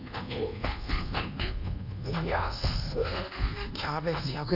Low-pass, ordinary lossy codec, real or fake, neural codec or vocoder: 5.4 kHz; none; fake; codec, 24 kHz, 1.2 kbps, DualCodec